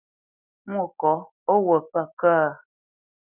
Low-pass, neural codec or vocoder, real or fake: 3.6 kHz; none; real